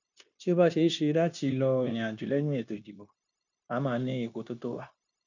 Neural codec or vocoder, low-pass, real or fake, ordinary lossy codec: codec, 16 kHz, 0.9 kbps, LongCat-Audio-Codec; 7.2 kHz; fake; none